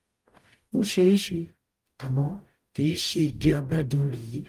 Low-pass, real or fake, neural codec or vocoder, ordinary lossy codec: 14.4 kHz; fake; codec, 44.1 kHz, 0.9 kbps, DAC; Opus, 32 kbps